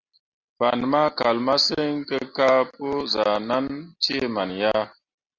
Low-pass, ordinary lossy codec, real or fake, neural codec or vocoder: 7.2 kHz; Opus, 64 kbps; real; none